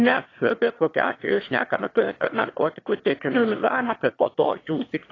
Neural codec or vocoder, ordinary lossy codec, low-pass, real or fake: autoencoder, 22.05 kHz, a latent of 192 numbers a frame, VITS, trained on one speaker; AAC, 32 kbps; 7.2 kHz; fake